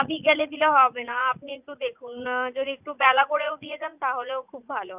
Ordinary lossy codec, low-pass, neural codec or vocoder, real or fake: none; 3.6 kHz; vocoder, 44.1 kHz, 80 mel bands, Vocos; fake